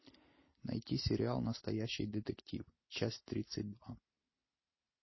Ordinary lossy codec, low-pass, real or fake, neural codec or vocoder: MP3, 24 kbps; 7.2 kHz; real; none